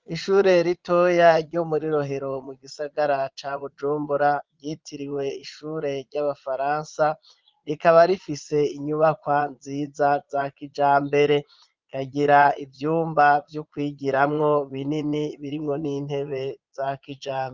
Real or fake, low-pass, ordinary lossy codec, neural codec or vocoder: fake; 7.2 kHz; Opus, 32 kbps; vocoder, 22.05 kHz, 80 mel bands, Vocos